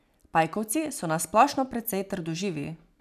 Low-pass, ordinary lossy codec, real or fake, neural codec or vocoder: 14.4 kHz; none; real; none